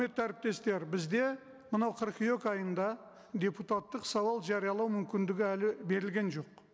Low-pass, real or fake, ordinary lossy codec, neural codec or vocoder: none; real; none; none